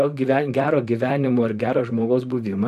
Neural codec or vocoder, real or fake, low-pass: vocoder, 44.1 kHz, 128 mel bands, Pupu-Vocoder; fake; 14.4 kHz